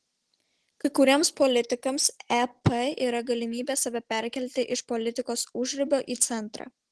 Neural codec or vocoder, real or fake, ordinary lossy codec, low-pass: none; real; Opus, 16 kbps; 10.8 kHz